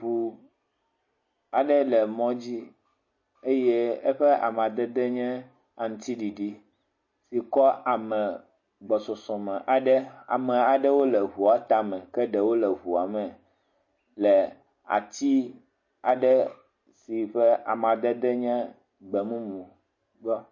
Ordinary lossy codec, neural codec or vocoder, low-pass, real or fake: MP3, 32 kbps; none; 7.2 kHz; real